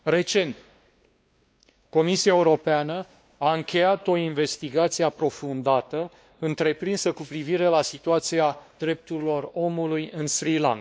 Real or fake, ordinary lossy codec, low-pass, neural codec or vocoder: fake; none; none; codec, 16 kHz, 2 kbps, X-Codec, WavLM features, trained on Multilingual LibriSpeech